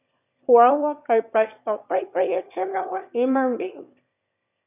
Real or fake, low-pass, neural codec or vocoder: fake; 3.6 kHz; autoencoder, 22.05 kHz, a latent of 192 numbers a frame, VITS, trained on one speaker